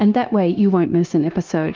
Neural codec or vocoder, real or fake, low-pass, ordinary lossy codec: codec, 16 kHz, 0.9 kbps, LongCat-Audio-Codec; fake; 7.2 kHz; Opus, 32 kbps